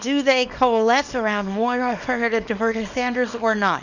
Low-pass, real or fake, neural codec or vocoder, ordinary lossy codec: 7.2 kHz; fake; codec, 24 kHz, 0.9 kbps, WavTokenizer, small release; Opus, 64 kbps